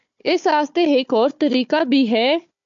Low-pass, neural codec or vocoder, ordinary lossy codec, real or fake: 7.2 kHz; codec, 16 kHz, 4 kbps, FunCodec, trained on Chinese and English, 50 frames a second; MP3, 64 kbps; fake